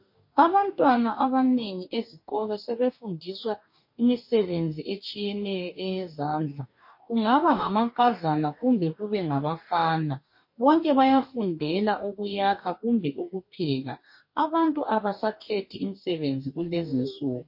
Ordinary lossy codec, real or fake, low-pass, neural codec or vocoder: MP3, 32 kbps; fake; 5.4 kHz; codec, 44.1 kHz, 2.6 kbps, DAC